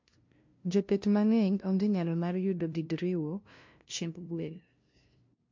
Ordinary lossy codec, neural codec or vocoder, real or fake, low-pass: MP3, 48 kbps; codec, 16 kHz, 0.5 kbps, FunCodec, trained on LibriTTS, 25 frames a second; fake; 7.2 kHz